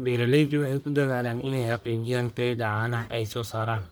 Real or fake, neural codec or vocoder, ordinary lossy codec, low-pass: fake; codec, 44.1 kHz, 1.7 kbps, Pupu-Codec; none; none